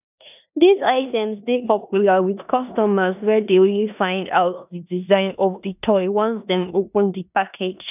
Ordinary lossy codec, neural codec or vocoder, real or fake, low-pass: none; codec, 16 kHz in and 24 kHz out, 0.9 kbps, LongCat-Audio-Codec, four codebook decoder; fake; 3.6 kHz